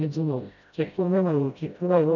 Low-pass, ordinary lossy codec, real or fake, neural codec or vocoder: 7.2 kHz; none; fake; codec, 16 kHz, 0.5 kbps, FreqCodec, smaller model